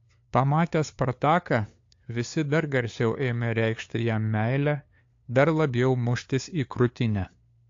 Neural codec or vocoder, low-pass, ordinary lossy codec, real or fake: codec, 16 kHz, 2 kbps, FunCodec, trained on LibriTTS, 25 frames a second; 7.2 kHz; AAC, 48 kbps; fake